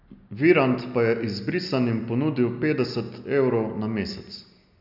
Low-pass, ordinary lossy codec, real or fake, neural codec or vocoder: 5.4 kHz; none; real; none